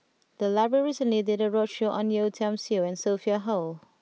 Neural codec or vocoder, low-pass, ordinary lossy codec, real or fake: none; none; none; real